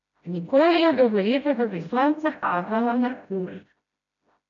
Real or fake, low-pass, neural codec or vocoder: fake; 7.2 kHz; codec, 16 kHz, 0.5 kbps, FreqCodec, smaller model